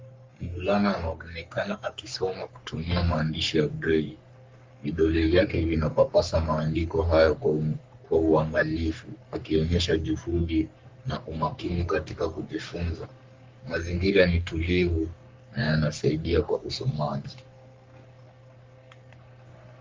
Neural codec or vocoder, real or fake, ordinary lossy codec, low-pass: codec, 44.1 kHz, 3.4 kbps, Pupu-Codec; fake; Opus, 32 kbps; 7.2 kHz